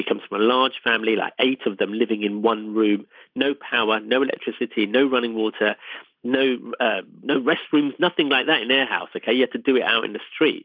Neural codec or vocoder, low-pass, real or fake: none; 5.4 kHz; real